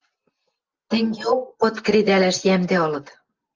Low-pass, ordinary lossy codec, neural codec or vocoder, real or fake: 7.2 kHz; Opus, 24 kbps; none; real